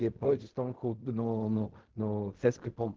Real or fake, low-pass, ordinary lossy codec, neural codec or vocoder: fake; 7.2 kHz; Opus, 16 kbps; codec, 16 kHz in and 24 kHz out, 0.4 kbps, LongCat-Audio-Codec, fine tuned four codebook decoder